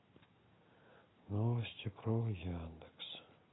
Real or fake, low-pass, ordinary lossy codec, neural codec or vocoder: real; 7.2 kHz; AAC, 16 kbps; none